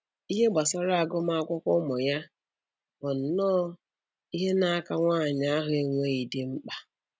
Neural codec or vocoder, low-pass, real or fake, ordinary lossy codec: none; none; real; none